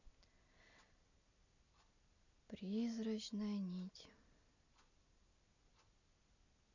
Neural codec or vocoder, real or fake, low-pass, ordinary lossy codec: none; real; 7.2 kHz; none